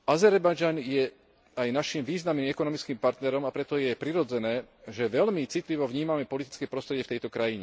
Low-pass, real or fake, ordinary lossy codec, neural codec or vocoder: none; real; none; none